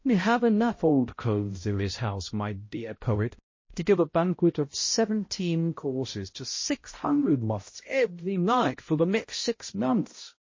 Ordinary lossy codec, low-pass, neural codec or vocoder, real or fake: MP3, 32 kbps; 7.2 kHz; codec, 16 kHz, 0.5 kbps, X-Codec, HuBERT features, trained on balanced general audio; fake